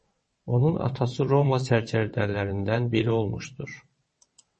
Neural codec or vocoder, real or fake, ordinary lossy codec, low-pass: vocoder, 22.05 kHz, 80 mel bands, WaveNeXt; fake; MP3, 32 kbps; 9.9 kHz